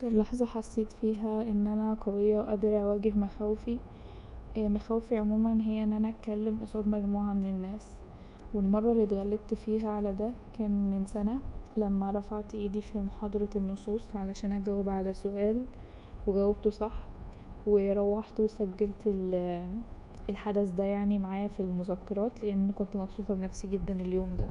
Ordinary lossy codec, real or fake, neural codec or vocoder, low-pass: none; fake; codec, 24 kHz, 1.2 kbps, DualCodec; 10.8 kHz